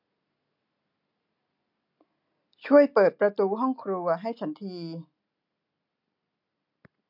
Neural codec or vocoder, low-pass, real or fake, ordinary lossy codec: none; 5.4 kHz; real; MP3, 48 kbps